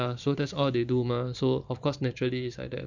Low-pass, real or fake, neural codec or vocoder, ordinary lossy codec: 7.2 kHz; fake; vocoder, 44.1 kHz, 128 mel bands every 512 samples, BigVGAN v2; none